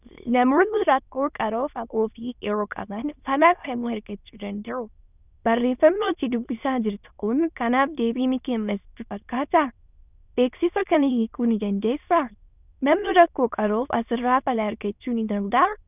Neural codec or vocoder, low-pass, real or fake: autoencoder, 22.05 kHz, a latent of 192 numbers a frame, VITS, trained on many speakers; 3.6 kHz; fake